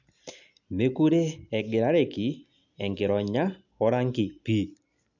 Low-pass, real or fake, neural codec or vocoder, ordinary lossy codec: 7.2 kHz; real; none; none